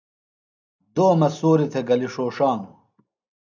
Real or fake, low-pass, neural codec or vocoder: real; 7.2 kHz; none